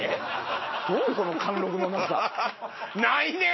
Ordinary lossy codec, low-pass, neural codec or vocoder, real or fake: MP3, 24 kbps; 7.2 kHz; none; real